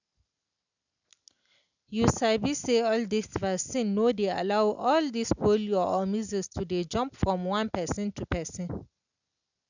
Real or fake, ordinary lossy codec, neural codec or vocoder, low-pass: real; none; none; 7.2 kHz